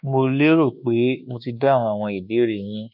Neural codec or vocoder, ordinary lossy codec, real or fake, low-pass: codec, 24 kHz, 1.2 kbps, DualCodec; none; fake; 5.4 kHz